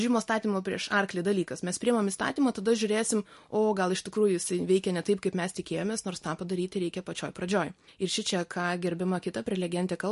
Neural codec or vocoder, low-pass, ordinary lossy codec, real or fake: none; 14.4 kHz; MP3, 48 kbps; real